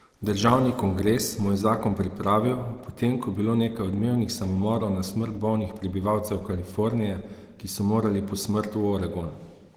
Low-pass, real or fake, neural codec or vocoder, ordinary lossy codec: 19.8 kHz; real; none; Opus, 16 kbps